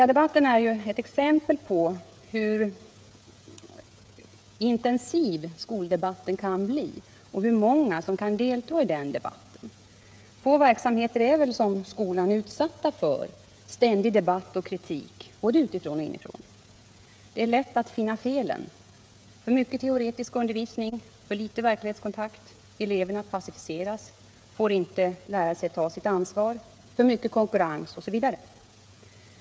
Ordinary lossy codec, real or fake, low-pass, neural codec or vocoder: none; fake; none; codec, 16 kHz, 16 kbps, FreqCodec, smaller model